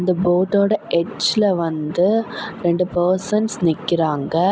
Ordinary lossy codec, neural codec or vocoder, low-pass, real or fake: none; none; none; real